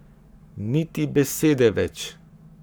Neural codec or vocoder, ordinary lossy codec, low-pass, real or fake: codec, 44.1 kHz, 7.8 kbps, Pupu-Codec; none; none; fake